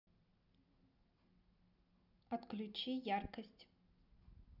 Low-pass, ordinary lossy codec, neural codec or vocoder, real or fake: 5.4 kHz; none; none; real